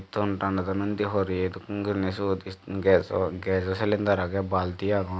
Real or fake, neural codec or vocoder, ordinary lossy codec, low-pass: real; none; none; none